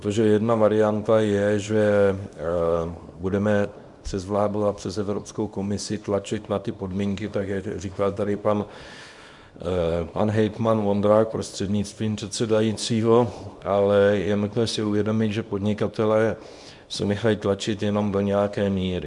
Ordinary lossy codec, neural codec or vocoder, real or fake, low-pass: Opus, 64 kbps; codec, 24 kHz, 0.9 kbps, WavTokenizer, small release; fake; 10.8 kHz